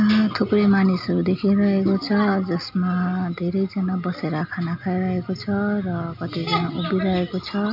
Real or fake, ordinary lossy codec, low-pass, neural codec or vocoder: real; none; 5.4 kHz; none